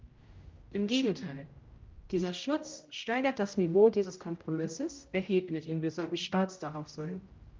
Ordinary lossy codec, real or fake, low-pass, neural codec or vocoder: Opus, 32 kbps; fake; 7.2 kHz; codec, 16 kHz, 0.5 kbps, X-Codec, HuBERT features, trained on general audio